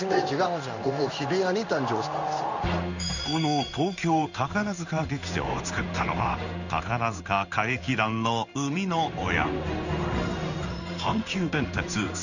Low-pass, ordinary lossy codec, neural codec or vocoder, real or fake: 7.2 kHz; none; codec, 16 kHz in and 24 kHz out, 1 kbps, XY-Tokenizer; fake